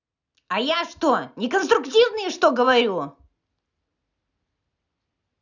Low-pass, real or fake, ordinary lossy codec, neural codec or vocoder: 7.2 kHz; real; none; none